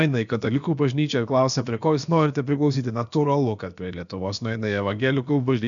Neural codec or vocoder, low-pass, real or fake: codec, 16 kHz, about 1 kbps, DyCAST, with the encoder's durations; 7.2 kHz; fake